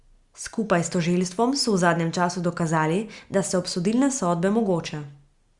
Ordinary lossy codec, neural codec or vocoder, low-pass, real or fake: Opus, 64 kbps; none; 10.8 kHz; real